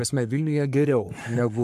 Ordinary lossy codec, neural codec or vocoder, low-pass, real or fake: AAC, 96 kbps; codec, 44.1 kHz, 3.4 kbps, Pupu-Codec; 14.4 kHz; fake